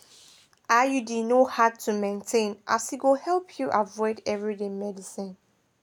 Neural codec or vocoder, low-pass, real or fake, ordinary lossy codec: none; 19.8 kHz; real; none